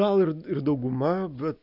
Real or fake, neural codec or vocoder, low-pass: real; none; 5.4 kHz